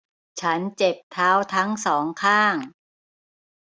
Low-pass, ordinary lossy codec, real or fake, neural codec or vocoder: none; none; real; none